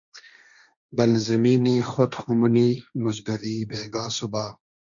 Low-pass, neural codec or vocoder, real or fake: 7.2 kHz; codec, 16 kHz, 1.1 kbps, Voila-Tokenizer; fake